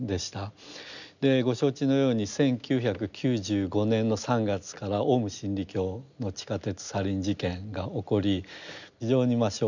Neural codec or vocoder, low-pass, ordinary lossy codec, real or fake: none; 7.2 kHz; none; real